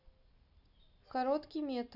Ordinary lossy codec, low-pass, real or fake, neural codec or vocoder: none; 5.4 kHz; real; none